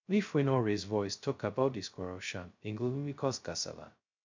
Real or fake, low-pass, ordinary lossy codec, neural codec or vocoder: fake; 7.2 kHz; MP3, 64 kbps; codec, 16 kHz, 0.2 kbps, FocalCodec